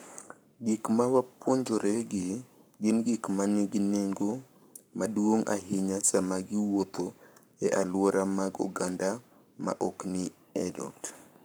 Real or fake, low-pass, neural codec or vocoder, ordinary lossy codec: fake; none; codec, 44.1 kHz, 7.8 kbps, Pupu-Codec; none